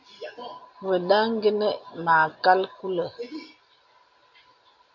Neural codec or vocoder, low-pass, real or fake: none; 7.2 kHz; real